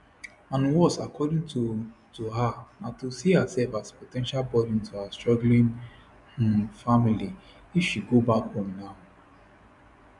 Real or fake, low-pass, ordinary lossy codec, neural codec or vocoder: fake; 10.8 kHz; none; vocoder, 24 kHz, 100 mel bands, Vocos